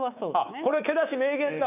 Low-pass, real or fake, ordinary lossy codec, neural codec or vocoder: 3.6 kHz; fake; none; codec, 24 kHz, 3.1 kbps, DualCodec